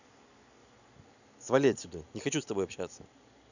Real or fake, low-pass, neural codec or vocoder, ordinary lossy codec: real; 7.2 kHz; none; none